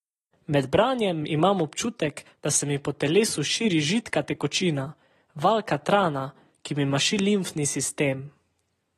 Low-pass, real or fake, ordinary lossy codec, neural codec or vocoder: 19.8 kHz; real; AAC, 32 kbps; none